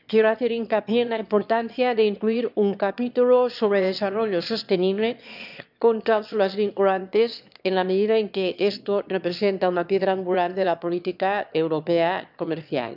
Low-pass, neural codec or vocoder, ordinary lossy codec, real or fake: 5.4 kHz; autoencoder, 22.05 kHz, a latent of 192 numbers a frame, VITS, trained on one speaker; none; fake